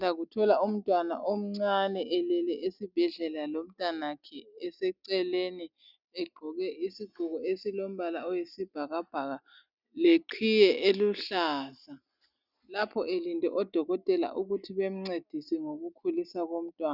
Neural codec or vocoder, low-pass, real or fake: none; 5.4 kHz; real